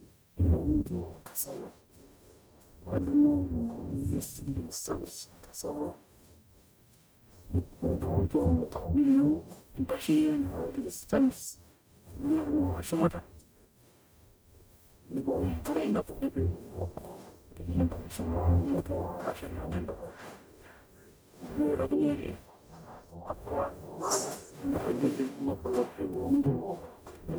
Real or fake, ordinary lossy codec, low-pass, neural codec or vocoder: fake; none; none; codec, 44.1 kHz, 0.9 kbps, DAC